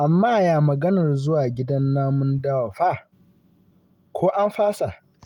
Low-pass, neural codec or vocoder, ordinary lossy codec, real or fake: 19.8 kHz; none; Opus, 32 kbps; real